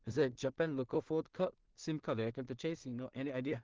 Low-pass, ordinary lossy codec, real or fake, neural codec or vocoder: 7.2 kHz; Opus, 16 kbps; fake; codec, 16 kHz in and 24 kHz out, 0.4 kbps, LongCat-Audio-Codec, two codebook decoder